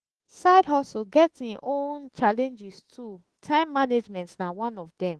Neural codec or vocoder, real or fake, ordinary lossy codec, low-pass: autoencoder, 48 kHz, 32 numbers a frame, DAC-VAE, trained on Japanese speech; fake; Opus, 16 kbps; 10.8 kHz